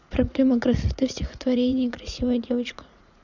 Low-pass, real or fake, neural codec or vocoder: 7.2 kHz; fake; vocoder, 44.1 kHz, 80 mel bands, Vocos